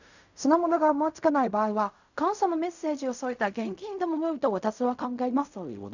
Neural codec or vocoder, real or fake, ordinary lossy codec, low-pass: codec, 16 kHz in and 24 kHz out, 0.4 kbps, LongCat-Audio-Codec, fine tuned four codebook decoder; fake; none; 7.2 kHz